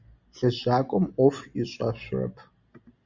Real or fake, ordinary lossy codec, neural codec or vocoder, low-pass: real; Opus, 64 kbps; none; 7.2 kHz